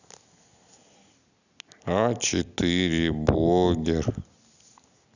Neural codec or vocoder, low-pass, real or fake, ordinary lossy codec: none; 7.2 kHz; real; none